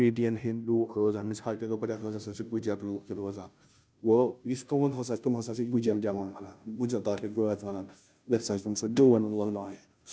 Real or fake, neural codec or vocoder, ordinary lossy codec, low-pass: fake; codec, 16 kHz, 0.5 kbps, FunCodec, trained on Chinese and English, 25 frames a second; none; none